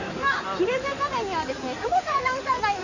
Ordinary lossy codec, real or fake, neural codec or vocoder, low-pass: none; fake; codec, 16 kHz in and 24 kHz out, 2.2 kbps, FireRedTTS-2 codec; 7.2 kHz